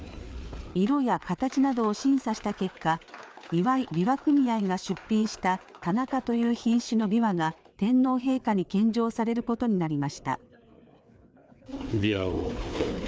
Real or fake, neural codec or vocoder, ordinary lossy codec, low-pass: fake; codec, 16 kHz, 4 kbps, FreqCodec, larger model; none; none